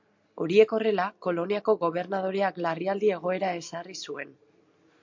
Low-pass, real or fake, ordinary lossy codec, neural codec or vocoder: 7.2 kHz; real; MP3, 48 kbps; none